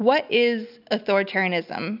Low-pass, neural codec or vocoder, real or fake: 5.4 kHz; none; real